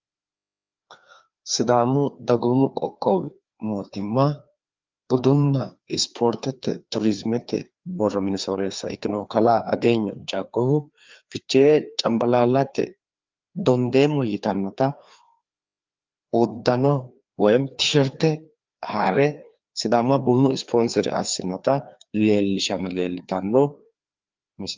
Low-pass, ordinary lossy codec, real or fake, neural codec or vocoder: 7.2 kHz; Opus, 32 kbps; fake; codec, 16 kHz, 2 kbps, FreqCodec, larger model